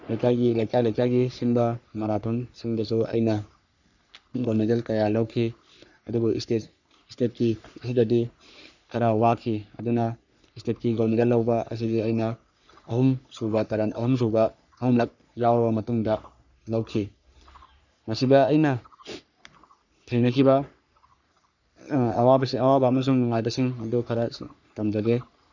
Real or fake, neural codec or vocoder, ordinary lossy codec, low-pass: fake; codec, 44.1 kHz, 3.4 kbps, Pupu-Codec; none; 7.2 kHz